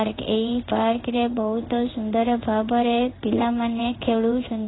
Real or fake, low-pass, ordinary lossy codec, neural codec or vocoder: fake; 7.2 kHz; AAC, 16 kbps; codec, 16 kHz in and 24 kHz out, 1 kbps, XY-Tokenizer